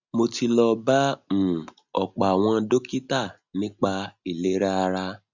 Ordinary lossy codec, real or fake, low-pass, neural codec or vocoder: none; real; 7.2 kHz; none